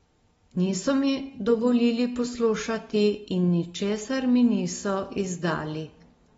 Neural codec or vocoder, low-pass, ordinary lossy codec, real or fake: none; 19.8 kHz; AAC, 24 kbps; real